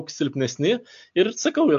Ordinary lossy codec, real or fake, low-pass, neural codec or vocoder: AAC, 64 kbps; real; 7.2 kHz; none